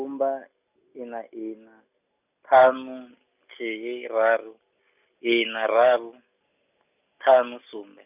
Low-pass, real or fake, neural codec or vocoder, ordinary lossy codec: 3.6 kHz; real; none; none